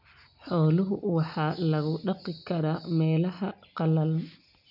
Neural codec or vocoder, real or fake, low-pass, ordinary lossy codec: none; real; 5.4 kHz; none